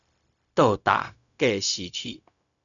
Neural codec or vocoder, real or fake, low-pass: codec, 16 kHz, 0.4 kbps, LongCat-Audio-Codec; fake; 7.2 kHz